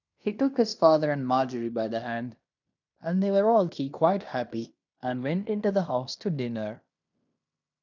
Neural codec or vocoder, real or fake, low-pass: codec, 16 kHz in and 24 kHz out, 0.9 kbps, LongCat-Audio-Codec, fine tuned four codebook decoder; fake; 7.2 kHz